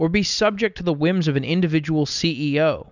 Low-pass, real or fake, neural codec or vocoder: 7.2 kHz; real; none